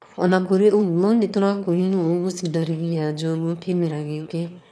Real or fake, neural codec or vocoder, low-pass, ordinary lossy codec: fake; autoencoder, 22.05 kHz, a latent of 192 numbers a frame, VITS, trained on one speaker; none; none